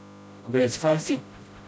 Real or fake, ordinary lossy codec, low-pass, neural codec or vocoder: fake; none; none; codec, 16 kHz, 0.5 kbps, FreqCodec, smaller model